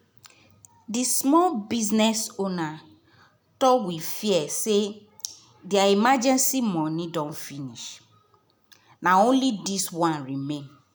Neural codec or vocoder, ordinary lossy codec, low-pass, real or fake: none; none; none; real